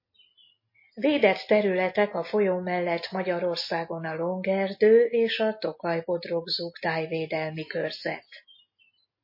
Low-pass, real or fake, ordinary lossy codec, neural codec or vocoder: 5.4 kHz; real; MP3, 24 kbps; none